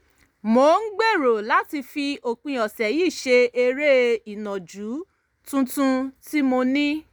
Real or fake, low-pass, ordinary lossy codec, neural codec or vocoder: real; none; none; none